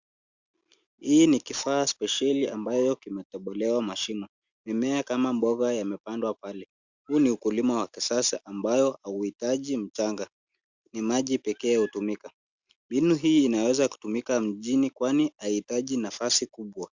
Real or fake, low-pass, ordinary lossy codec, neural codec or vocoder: real; 7.2 kHz; Opus, 64 kbps; none